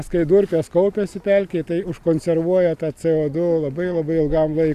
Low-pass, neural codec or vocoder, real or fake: 14.4 kHz; none; real